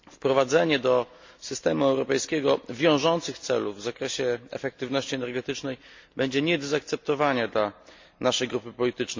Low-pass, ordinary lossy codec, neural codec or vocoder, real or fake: 7.2 kHz; MP3, 48 kbps; none; real